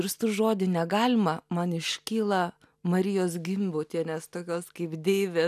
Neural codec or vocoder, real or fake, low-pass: none; real; 14.4 kHz